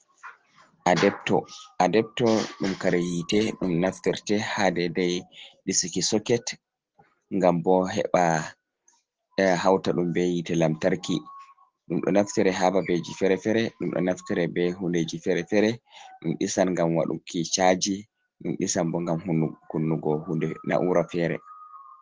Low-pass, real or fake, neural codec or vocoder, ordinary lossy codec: 7.2 kHz; real; none; Opus, 16 kbps